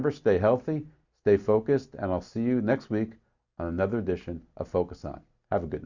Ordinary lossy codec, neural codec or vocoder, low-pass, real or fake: Opus, 64 kbps; none; 7.2 kHz; real